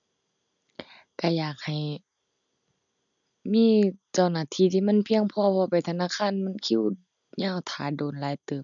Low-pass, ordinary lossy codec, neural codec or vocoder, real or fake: 7.2 kHz; MP3, 96 kbps; none; real